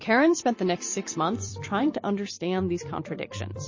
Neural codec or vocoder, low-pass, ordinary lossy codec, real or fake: vocoder, 44.1 kHz, 128 mel bands every 256 samples, BigVGAN v2; 7.2 kHz; MP3, 32 kbps; fake